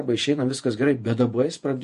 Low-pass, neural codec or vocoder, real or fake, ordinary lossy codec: 14.4 kHz; vocoder, 48 kHz, 128 mel bands, Vocos; fake; MP3, 48 kbps